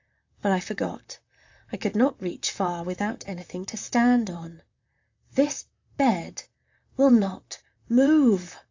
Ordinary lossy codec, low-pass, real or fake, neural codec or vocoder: AAC, 48 kbps; 7.2 kHz; fake; vocoder, 44.1 kHz, 128 mel bands, Pupu-Vocoder